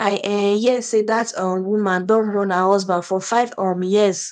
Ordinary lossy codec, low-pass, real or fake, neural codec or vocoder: none; 9.9 kHz; fake; codec, 24 kHz, 0.9 kbps, WavTokenizer, small release